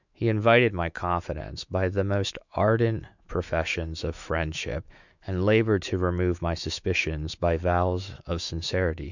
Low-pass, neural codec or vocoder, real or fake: 7.2 kHz; autoencoder, 48 kHz, 128 numbers a frame, DAC-VAE, trained on Japanese speech; fake